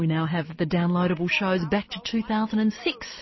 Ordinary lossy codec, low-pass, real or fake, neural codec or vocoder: MP3, 24 kbps; 7.2 kHz; real; none